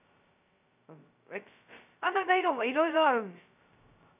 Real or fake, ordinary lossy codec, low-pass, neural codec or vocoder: fake; none; 3.6 kHz; codec, 16 kHz, 0.2 kbps, FocalCodec